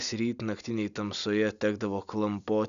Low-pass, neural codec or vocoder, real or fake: 7.2 kHz; none; real